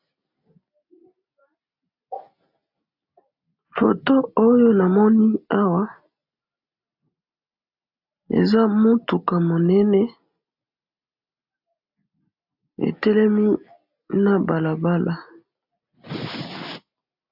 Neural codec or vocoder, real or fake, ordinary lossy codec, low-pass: none; real; AAC, 48 kbps; 5.4 kHz